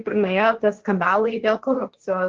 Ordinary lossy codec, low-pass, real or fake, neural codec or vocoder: Opus, 16 kbps; 7.2 kHz; fake; codec, 16 kHz, 1.1 kbps, Voila-Tokenizer